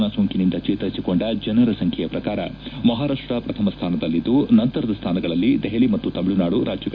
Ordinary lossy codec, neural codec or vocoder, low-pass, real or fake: none; none; 7.2 kHz; real